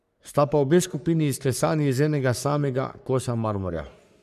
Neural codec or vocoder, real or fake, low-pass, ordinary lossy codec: codec, 44.1 kHz, 3.4 kbps, Pupu-Codec; fake; 14.4 kHz; none